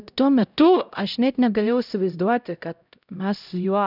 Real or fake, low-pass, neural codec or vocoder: fake; 5.4 kHz; codec, 16 kHz, 0.5 kbps, X-Codec, HuBERT features, trained on LibriSpeech